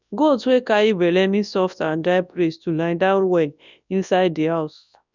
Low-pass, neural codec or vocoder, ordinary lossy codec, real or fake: 7.2 kHz; codec, 24 kHz, 0.9 kbps, WavTokenizer, large speech release; none; fake